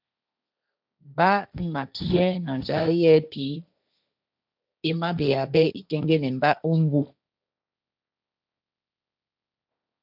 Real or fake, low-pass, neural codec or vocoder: fake; 5.4 kHz; codec, 16 kHz, 1.1 kbps, Voila-Tokenizer